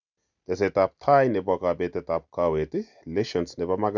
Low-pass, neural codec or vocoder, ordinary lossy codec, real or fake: 7.2 kHz; none; none; real